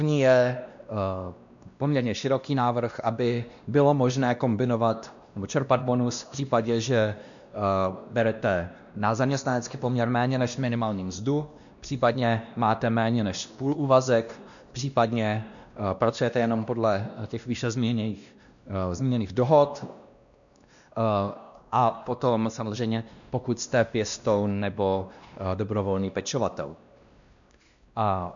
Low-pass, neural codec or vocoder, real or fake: 7.2 kHz; codec, 16 kHz, 1 kbps, X-Codec, WavLM features, trained on Multilingual LibriSpeech; fake